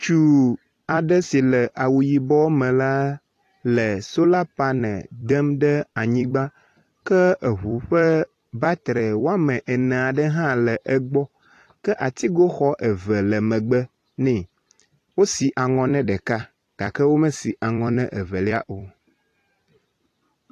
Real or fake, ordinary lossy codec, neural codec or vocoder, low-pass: fake; AAC, 48 kbps; vocoder, 44.1 kHz, 128 mel bands every 256 samples, BigVGAN v2; 14.4 kHz